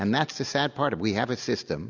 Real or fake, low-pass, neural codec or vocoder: real; 7.2 kHz; none